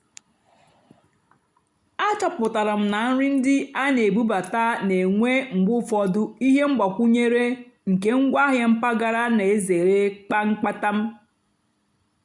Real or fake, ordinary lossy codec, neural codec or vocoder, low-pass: real; none; none; 10.8 kHz